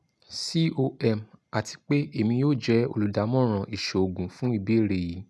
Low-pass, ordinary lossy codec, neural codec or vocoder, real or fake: none; none; none; real